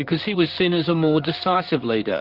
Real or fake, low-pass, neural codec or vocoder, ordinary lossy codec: fake; 5.4 kHz; vocoder, 44.1 kHz, 128 mel bands, Pupu-Vocoder; Opus, 32 kbps